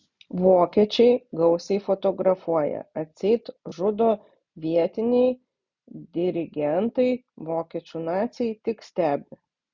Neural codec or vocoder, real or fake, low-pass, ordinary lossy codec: none; real; 7.2 kHz; Opus, 64 kbps